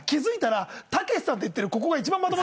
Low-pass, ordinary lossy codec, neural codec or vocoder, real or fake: none; none; none; real